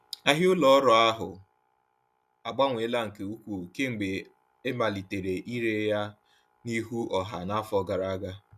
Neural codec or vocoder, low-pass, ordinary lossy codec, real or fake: none; 14.4 kHz; none; real